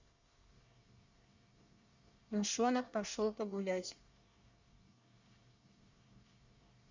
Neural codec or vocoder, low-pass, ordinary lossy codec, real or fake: codec, 24 kHz, 1 kbps, SNAC; 7.2 kHz; Opus, 64 kbps; fake